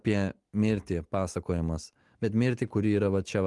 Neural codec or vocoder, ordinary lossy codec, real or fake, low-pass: none; Opus, 24 kbps; real; 10.8 kHz